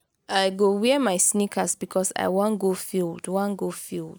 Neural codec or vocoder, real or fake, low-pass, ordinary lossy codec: none; real; none; none